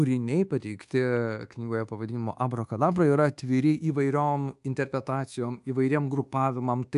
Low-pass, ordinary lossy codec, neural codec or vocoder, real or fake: 10.8 kHz; AAC, 96 kbps; codec, 24 kHz, 1.2 kbps, DualCodec; fake